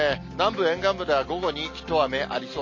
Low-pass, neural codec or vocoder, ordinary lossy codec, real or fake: 7.2 kHz; none; MP3, 32 kbps; real